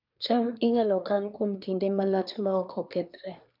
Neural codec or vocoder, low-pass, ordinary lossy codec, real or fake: codec, 24 kHz, 1 kbps, SNAC; 5.4 kHz; none; fake